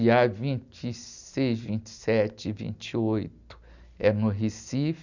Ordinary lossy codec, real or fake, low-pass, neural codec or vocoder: none; real; 7.2 kHz; none